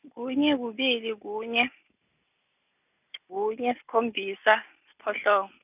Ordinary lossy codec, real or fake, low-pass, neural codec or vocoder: none; real; 3.6 kHz; none